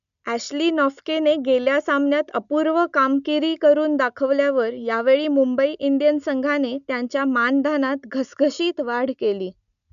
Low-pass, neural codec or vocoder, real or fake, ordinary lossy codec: 7.2 kHz; none; real; none